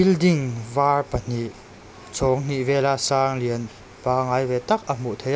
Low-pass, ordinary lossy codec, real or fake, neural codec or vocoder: none; none; real; none